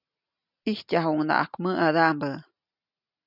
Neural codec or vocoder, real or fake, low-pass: none; real; 5.4 kHz